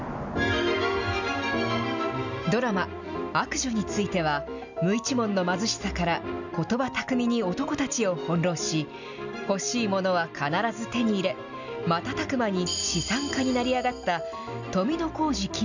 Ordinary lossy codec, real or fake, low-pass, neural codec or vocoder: none; real; 7.2 kHz; none